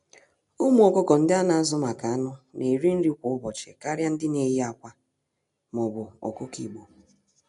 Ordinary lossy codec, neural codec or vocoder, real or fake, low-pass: none; none; real; 10.8 kHz